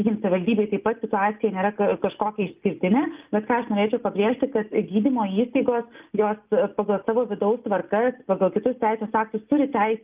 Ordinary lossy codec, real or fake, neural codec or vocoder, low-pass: Opus, 24 kbps; real; none; 3.6 kHz